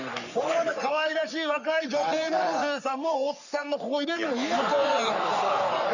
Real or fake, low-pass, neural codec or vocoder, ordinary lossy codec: fake; 7.2 kHz; codec, 44.1 kHz, 3.4 kbps, Pupu-Codec; none